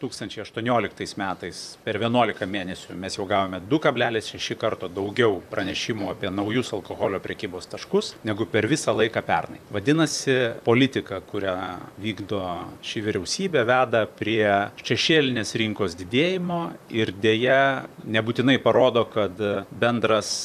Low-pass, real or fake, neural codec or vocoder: 14.4 kHz; fake; vocoder, 44.1 kHz, 128 mel bands, Pupu-Vocoder